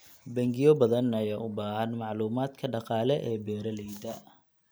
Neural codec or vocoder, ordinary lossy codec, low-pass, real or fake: none; none; none; real